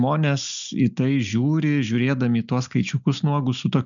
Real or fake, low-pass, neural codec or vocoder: real; 7.2 kHz; none